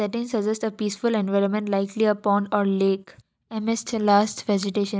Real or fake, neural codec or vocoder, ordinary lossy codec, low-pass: real; none; none; none